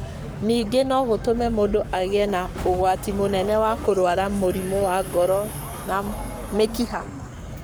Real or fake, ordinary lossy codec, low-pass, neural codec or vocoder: fake; none; none; codec, 44.1 kHz, 7.8 kbps, Pupu-Codec